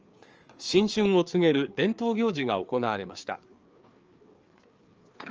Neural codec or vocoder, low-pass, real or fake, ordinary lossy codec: codec, 16 kHz, 4 kbps, FreqCodec, larger model; 7.2 kHz; fake; Opus, 24 kbps